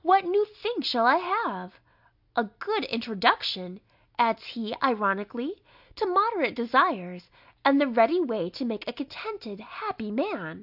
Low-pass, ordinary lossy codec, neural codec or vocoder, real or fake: 5.4 kHz; AAC, 48 kbps; none; real